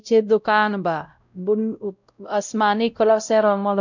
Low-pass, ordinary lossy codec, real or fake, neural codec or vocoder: 7.2 kHz; none; fake; codec, 16 kHz, 0.5 kbps, X-Codec, WavLM features, trained on Multilingual LibriSpeech